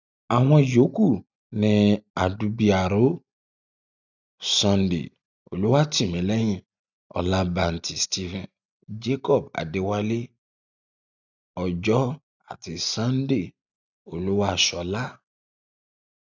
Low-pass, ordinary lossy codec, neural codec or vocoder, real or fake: 7.2 kHz; none; none; real